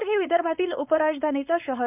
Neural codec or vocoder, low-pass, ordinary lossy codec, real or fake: codec, 16 kHz, 4.8 kbps, FACodec; 3.6 kHz; none; fake